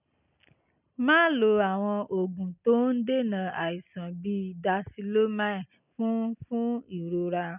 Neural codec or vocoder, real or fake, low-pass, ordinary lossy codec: none; real; 3.6 kHz; none